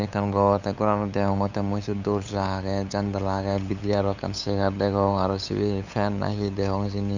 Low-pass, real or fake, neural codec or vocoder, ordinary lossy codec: 7.2 kHz; fake; codec, 16 kHz, 8 kbps, FunCodec, trained on LibriTTS, 25 frames a second; Opus, 64 kbps